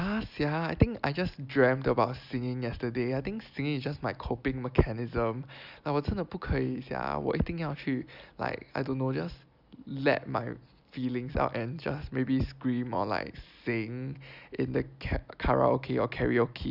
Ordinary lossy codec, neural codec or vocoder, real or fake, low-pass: Opus, 64 kbps; none; real; 5.4 kHz